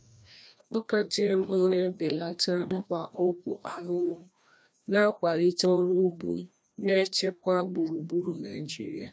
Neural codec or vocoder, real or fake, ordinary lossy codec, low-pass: codec, 16 kHz, 1 kbps, FreqCodec, larger model; fake; none; none